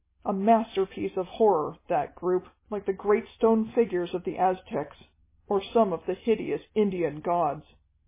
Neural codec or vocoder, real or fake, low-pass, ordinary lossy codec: none; real; 3.6 kHz; MP3, 16 kbps